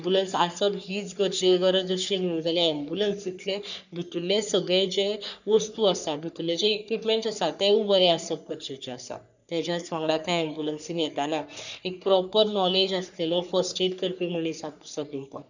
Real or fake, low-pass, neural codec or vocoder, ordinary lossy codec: fake; 7.2 kHz; codec, 44.1 kHz, 3.4 kbps, Pupu-Codec; none